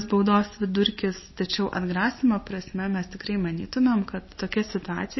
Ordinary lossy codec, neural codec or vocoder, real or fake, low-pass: MP3, 24 kbps; none; real; 7.2 kHz